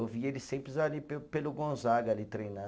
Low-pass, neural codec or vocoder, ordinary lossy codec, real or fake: none; none; none; real